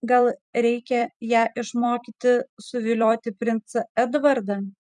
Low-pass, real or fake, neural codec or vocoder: 10.8 kHz; fake; vocoder, 44.1 kHz, 128 mel bands every 256 samples, BigVGAN v2